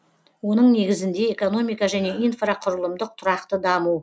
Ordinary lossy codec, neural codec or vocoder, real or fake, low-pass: none; none; real; none